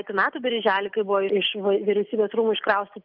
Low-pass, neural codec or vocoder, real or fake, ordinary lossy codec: 5.4 kHz; none; real; Opus, 24 kbps